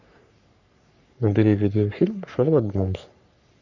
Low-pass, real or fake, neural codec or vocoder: 7.2 kHz; fake; codec, 44.1 kHz, 3.4 kbps, Pupu-Codec